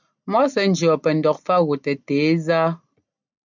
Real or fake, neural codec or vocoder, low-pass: real; none; 7.2 kHz